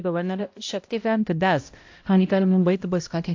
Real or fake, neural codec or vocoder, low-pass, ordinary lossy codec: fake; codec, 16 kHz, 0.5 kbps, X-Codec, HuBERT features, trained on balanced general audio; 7.2 kHz; AAC, 48 kbps